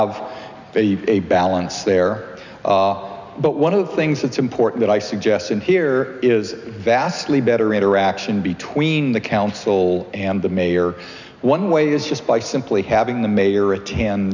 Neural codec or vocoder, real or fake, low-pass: none; real; 7.2 kHz